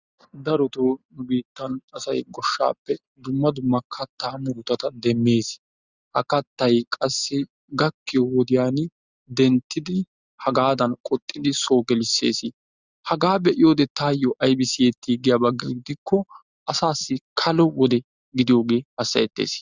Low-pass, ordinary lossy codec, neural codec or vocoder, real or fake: 7.2 kHz; Opus, 64 kbps; none; real